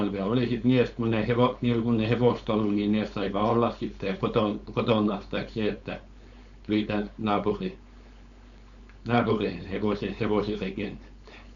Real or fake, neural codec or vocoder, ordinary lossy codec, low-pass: fake; codec, 16 kHz, 4.8 kbps, FACodec; none; 7.2 kHz